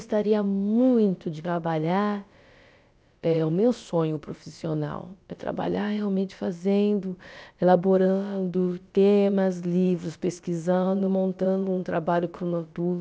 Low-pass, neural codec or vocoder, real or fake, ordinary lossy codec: none; codec, 16 kHz, about 1 kbps, DyCAST, with the encoder's durations; fake; none